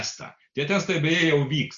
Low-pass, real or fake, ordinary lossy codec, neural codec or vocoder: 7.2 kHz; real; Opus, 64 kbps; none